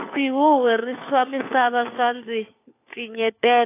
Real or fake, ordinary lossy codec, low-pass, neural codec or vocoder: fake; AAC, 24 kbps; 3.6 kHz; codec, 16 kHz, 4 kbps, FunCodec, trained on LibriTTS, 50 frames a second